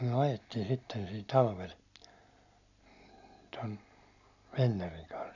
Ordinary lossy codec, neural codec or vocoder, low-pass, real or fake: none; none; 7.2 kHz; real